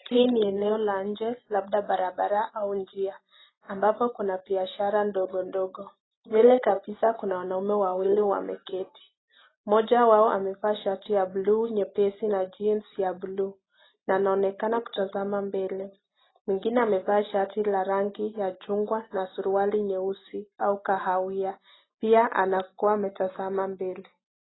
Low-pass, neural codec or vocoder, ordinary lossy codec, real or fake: 7.2 kHz; none; AAC, 16 kbps; real